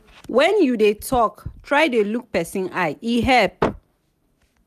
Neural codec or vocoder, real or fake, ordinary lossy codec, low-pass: none; real; none; 14.4 kHz